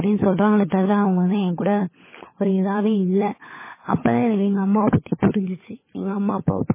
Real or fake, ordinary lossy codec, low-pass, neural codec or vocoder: fake; MP3, 16 kbps; 3.6 kHz; codec, 16 kHz, 16 kbps, FunCodec, trained on LibriTTS, 50 frames a second